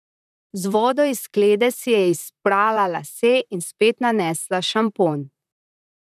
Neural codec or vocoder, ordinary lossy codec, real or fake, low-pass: vocoder, 44.1 kHz, 128 mel bands, Pupu-Vocoder; none; fake; 14.4 kHz